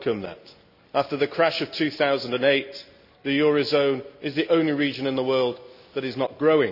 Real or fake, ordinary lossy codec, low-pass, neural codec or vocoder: real; none; 5.4 kHz; none